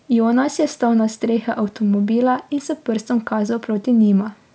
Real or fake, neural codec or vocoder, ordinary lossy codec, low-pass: real; none; none; none